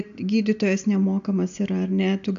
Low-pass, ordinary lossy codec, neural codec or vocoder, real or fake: 7.2 kHz; AAC, 64 kbps; none; real